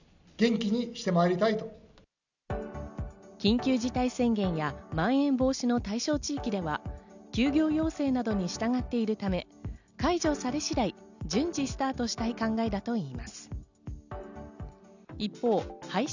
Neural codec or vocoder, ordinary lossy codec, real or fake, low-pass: none; none; real; 7.2 kHz